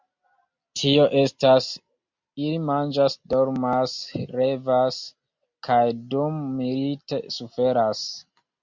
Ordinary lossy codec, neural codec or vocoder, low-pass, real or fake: MP3, 64 kbps; none; 7.2 kHz; real